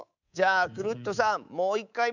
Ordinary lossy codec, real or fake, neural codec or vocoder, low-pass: none; fake; codec, 24 kHz, 3.1 kbps, DualCodec; 7.2 kHz